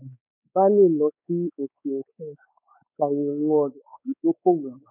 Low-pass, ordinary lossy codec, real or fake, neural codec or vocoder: 3.6 kHz; none; fake; codec, 16 kHz, 4 kbps, X-Codec, WavLM features, trained on Multilingual LibriSpeech